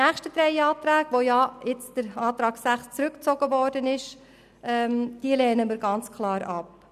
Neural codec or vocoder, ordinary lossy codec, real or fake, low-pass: none; none; real; 14.4 kHz